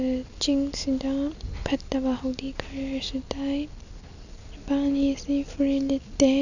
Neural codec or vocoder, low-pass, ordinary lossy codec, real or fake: none; 7.2 kHz; none; real